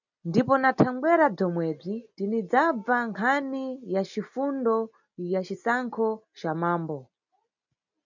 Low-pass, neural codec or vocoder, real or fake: 7.2 kHz; none; real